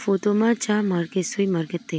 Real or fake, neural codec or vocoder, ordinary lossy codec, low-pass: real; none; none; none